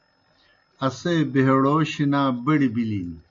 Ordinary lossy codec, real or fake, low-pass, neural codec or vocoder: MP3, 48 kbps; real; 7.2 kHz; none